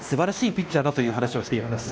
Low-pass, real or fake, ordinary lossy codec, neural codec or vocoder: none; fake; none; codec, 16 kHz, 1 kbps, X-Codec, WavLM features, trained on Multilingual LibriSpeech